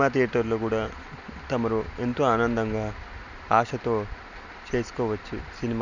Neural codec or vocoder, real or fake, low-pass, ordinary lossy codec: none; real; 7.2 kHz; none